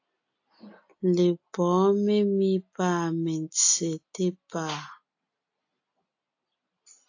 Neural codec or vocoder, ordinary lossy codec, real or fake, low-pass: none; AAC, 48 kbps; real; 7.2 kHz